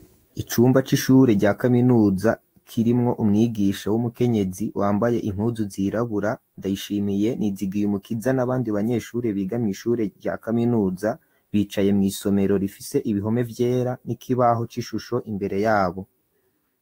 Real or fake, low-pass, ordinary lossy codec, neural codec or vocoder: fake; 19.8 kHz; AAC, 48 kbps; autoencoder, 48 kHz, 128 numbers a frame, DAC-VAE, trained on Japanese speech